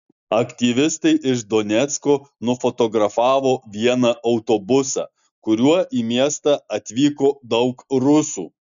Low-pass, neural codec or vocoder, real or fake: 7.2 kHz; none; real